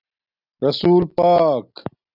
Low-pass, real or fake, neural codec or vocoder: 5.4 kHz; real; none